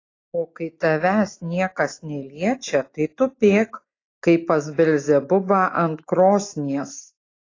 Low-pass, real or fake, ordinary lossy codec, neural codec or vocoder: 7.2 kHz; real; AAC, 32 kbps; none